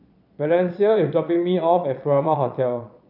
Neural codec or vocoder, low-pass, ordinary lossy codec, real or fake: vocoder, 22.05 kHz, 80 mel bands, Vocos; 5.4 kHz; none; fake